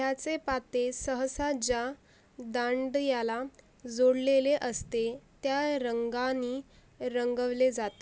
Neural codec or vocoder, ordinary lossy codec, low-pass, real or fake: none; none; none; real